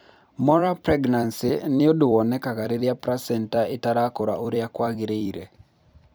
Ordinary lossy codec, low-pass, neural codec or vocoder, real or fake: none; none; vocoder, 44.1 kHz, 128 mel bands every 256 samples, BigVGAN v2; fake